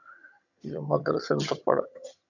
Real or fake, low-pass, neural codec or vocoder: fake; 7.2 kHz; vocoder, 22.05 kHz, 80 mel bands, HiFi-GAN